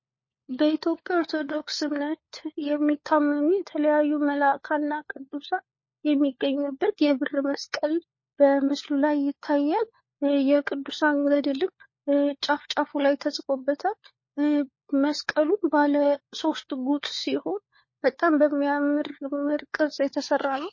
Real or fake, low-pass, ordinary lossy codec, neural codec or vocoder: fake; 7.2 kHz; MP3, 32 kbps; codec, 16 kHz, 4 kbps, FunCodec, trained on LibriTTS, 50 frames a second